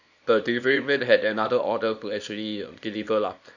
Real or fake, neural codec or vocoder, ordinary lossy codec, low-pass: fake; codec, 24 kHz, 0.9 kbps, WavTokenizer, small release; none; 7.2 kHz